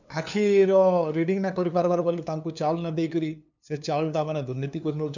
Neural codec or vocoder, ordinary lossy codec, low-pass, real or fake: codec, 16 kHz, 2 kbps, FunCodec, trained on LibriTTS, 25 frames a second; none; 7.2 kHz; fake